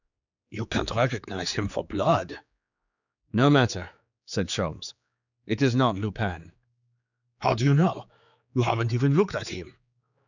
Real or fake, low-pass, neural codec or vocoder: fake; 7.2 kHz; codec, 16 kHz, 4 kbps, X-Codec, HuBERT features, trained on general audio